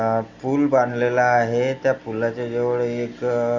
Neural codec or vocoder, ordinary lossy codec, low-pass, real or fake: none; none; 7.2 kHz; real